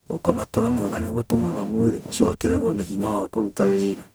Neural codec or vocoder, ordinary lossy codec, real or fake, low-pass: codec, 44.1 kHz, 0.9 kbps, DAC; none; fake; none